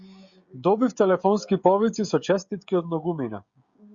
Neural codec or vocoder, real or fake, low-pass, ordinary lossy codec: codec, 16 kHz, 16 kbps, FreqCodec, smaller model; fake; 7.2 kHz; Opus, 64 kbps